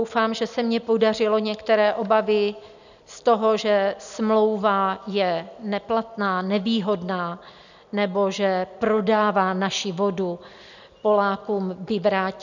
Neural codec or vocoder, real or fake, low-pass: none; real; 7.2 kHz